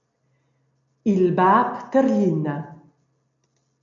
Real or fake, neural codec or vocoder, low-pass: real; none; 7.2 kHz